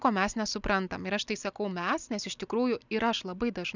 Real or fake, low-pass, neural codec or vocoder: real; 7.2 kHz; none